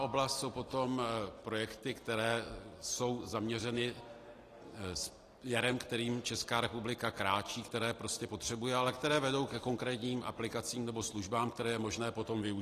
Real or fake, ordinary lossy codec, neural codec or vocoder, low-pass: real; AAC, 48 kbps; none; 14.4 kHz